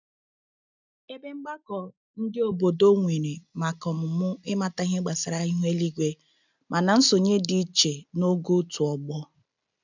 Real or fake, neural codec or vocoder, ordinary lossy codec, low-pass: real; none; none; 7.2 kHz